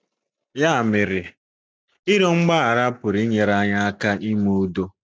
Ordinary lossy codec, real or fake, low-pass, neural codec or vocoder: none; real; none; none